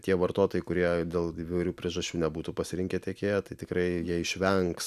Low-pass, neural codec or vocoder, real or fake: 14.4 kHz; none; real